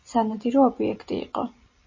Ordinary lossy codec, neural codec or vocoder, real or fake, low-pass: MP3, 32 kbps; none; real; 7.2 kHz